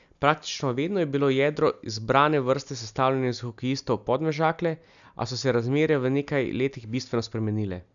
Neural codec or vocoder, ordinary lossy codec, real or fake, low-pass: none; none; real; 7.2 kHz